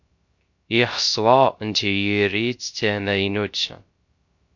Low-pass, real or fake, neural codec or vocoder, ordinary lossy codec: 7.2 kHz; fake; codec, 16 kHz, 0.3 kbps, FocalCodec; MP3, 48 kbps